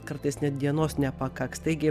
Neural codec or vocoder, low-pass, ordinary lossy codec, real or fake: none; 14.4 kHz; Opus, 64 kbps; real